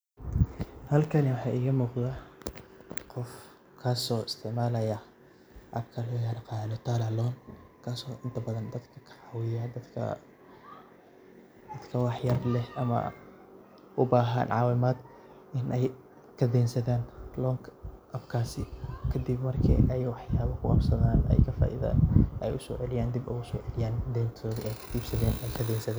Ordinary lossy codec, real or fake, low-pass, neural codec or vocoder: none; real; none; none